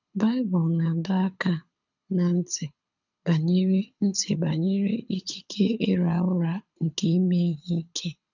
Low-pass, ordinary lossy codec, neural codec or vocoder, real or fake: 7.2 kHz; none; codec, 24 kHz, 6 kbps, HILCodec; fake